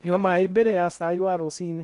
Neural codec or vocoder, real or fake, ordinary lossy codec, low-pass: codec, 16 kHz in and 24 kHz out, 0.6 kbps, FocalCodec, streaming, 4096 codes; fake; none; 10.8 kHz